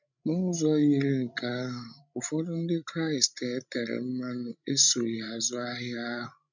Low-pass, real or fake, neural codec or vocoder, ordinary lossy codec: 7.2 kHz; fake; codec, 16 kHz, 16 kbps, FreqCodec, larger model; none